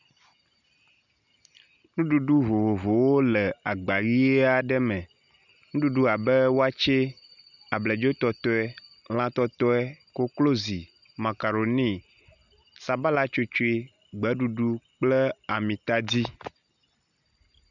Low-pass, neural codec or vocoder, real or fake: 7.2 kHz; none; real